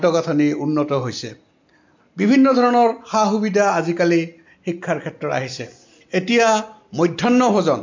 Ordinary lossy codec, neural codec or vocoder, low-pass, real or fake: MP3, 48 kbps; none; 7.2 kHz; real